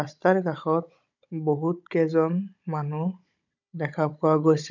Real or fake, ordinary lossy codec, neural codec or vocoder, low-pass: fake; none; codec, 16 kHz, 16 kbps, FunCodec, trained on Chinese and English, 50 frames a second; 7.2 kHz